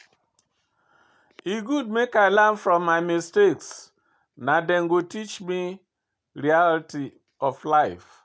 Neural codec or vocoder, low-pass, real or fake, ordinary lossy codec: none; none; real; none